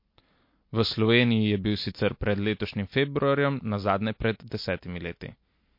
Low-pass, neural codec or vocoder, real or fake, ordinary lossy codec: 5.4 kHz; none; real; MP3, 32 kbps